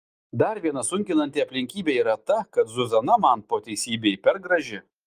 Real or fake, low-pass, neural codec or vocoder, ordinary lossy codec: real; 14.4 kHz; none; Opus, 32 kbps